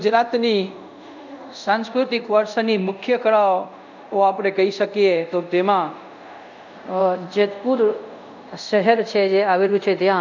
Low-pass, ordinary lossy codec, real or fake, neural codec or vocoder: 7.2 kHz; none; fake; codec, 24 kHz, 0.5 kbps, DualCodec